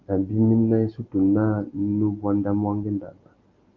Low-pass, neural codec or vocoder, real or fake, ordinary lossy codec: 7.2 kHz; none; real; Opus, 24 kbps